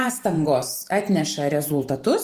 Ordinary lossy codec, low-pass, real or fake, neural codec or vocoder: Opus, 24 kbps; 14.4 kHz; fake; vocoder, 44.1 kHz, 128 mel bands every 512 samples, BigVGAN v2